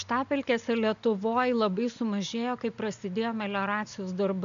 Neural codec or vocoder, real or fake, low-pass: none; real; 7.2 kHz